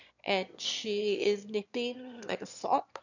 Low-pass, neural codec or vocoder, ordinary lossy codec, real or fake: 7.2 kHz; autoencoder, 22.05 kHz, a latent of 192 numbers a frame, VITS, trained on one speaker; none; fake